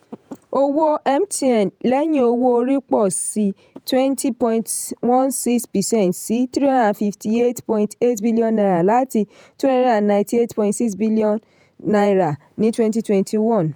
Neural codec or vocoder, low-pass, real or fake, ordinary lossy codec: vocoder, 48 kHz, 128 mel bands, Vocos; none; fake; none